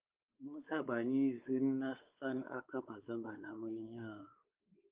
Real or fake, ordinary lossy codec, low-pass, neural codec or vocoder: fake; Opus, 32 kbps; 3.6 kHz; codec, 16 kHz, 4 kbps, X-Codec, WavLM features, trained on Multilingual LibriSpeech